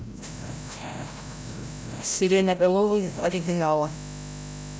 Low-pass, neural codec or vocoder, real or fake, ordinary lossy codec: none; codec, 16 kHz, 0.5 kbps, FreqCodec, larger model; fake; none